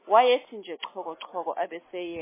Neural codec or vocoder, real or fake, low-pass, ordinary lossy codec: none; real; 3.6 kHz; MP3, 16 kbps